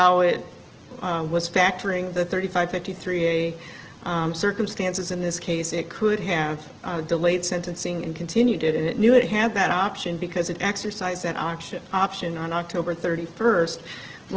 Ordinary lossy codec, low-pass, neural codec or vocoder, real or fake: Opus, 16 kbps; 7.2 kHz; none; real